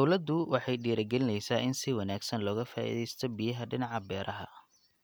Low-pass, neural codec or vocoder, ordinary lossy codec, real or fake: none; none; none; real